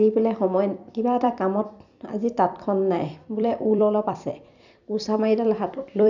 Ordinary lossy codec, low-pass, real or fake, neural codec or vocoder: none; 7.2 kHz; fake; vocoder, 44.1 kHz, 128 mel bands every 256 samples, BigVGAN v2